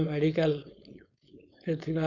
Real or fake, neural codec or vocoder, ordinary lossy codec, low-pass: fake; codec, 16 kHz, 4.8 kbps, FACodec; none; 7.2 kHz